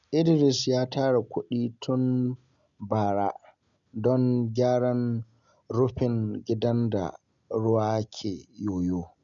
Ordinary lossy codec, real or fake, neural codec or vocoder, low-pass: none; real; none; 7.2 kHz